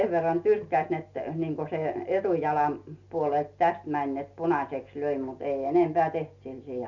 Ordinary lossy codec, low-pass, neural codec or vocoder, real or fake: none; 7.2 kHz; none; real